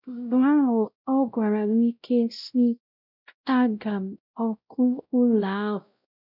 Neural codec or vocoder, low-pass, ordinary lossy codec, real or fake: codec, 16 kHz, 0.5 kbps, X-Codec, WavLM features, trained on Multilingual LibriSpeech; 5.4 kHz; none; fake